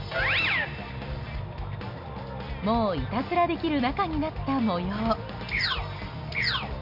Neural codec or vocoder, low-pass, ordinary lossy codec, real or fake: none; 5.4 kHz; none; real